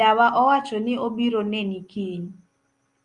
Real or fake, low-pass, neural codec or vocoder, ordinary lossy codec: real; 10.8 kHz; none; Opus, 32 kbps